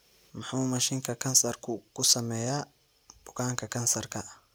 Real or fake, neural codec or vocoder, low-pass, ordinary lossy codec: real; none; none; none